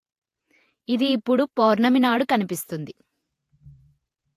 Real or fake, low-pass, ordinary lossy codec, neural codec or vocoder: fake; 14.4 kHz; AAC, 64 kbps; vocoder, 48 kHz, 128 mel bands, Vocos